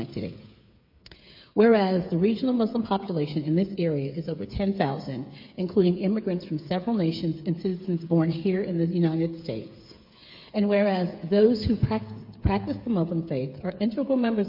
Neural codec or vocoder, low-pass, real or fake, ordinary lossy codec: codec, 16 kHz, 8 kbps, FreqCodec, smaller model; 5.4 kHz; fake; MP3, 32 kbps